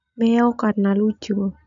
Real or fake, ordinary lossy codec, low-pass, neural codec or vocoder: real; none; 7.2 kHz; none